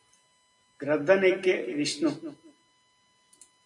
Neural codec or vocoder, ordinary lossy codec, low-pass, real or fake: none; MP3, 96 kbps; 10.8 kHz; real